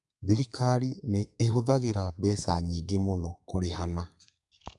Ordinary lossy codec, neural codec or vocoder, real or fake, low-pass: none; codec, 32 kHz, 1.9 kbps, SNAC; fake; 10.8 kHz